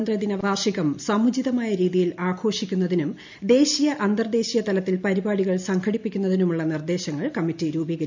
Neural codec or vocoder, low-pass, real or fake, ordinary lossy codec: none; 7.2 kHz; real; MP3, 64 kbps